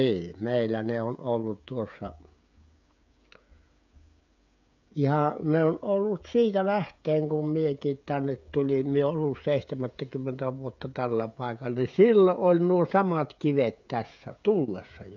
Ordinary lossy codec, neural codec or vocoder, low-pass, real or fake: none; codec, 16 kHz, 16 kbps, FreqCodec, smaller model; 7.2 kHz; fake